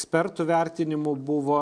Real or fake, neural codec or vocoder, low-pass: real; none; 9.9 kHz